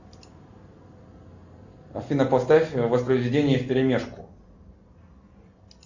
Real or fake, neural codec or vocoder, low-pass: real; none; 7.2 kHz